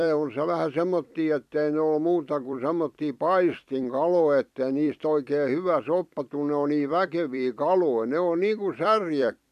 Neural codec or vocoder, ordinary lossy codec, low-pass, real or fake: vocoder, 44.1 kHz, 128 mel bands every 256 samples, BigVGAN v2; MP3, 96 kbps; 14.4 kHz; fake